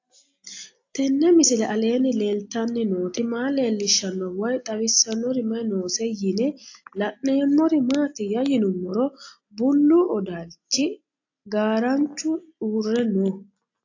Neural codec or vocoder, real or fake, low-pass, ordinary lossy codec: none; real; 7.2 kHz; AAC, 48 kbps